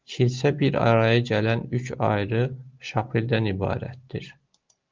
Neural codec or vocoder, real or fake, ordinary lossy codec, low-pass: none; real; Opus, 32 kbps; 7.2 kHz